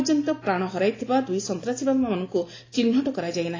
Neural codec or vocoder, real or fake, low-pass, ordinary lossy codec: none; real; 7.2 kHz; AAC, 32 kbps